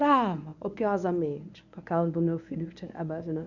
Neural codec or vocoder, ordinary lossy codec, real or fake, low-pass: codec, 24 kHz, 0.9 kbps, WavTokenizer, medium speech release version 1; none; fake; 7.2 kHz